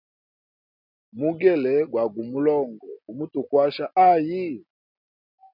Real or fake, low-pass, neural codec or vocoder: real; 5.4 kHz; none